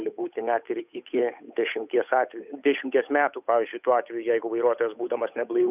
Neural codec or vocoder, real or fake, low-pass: codec, 16 kHz, 8 kbps, FunCodec, trained on Chinese and English, 25 frames a second; fake; 3.6 kHz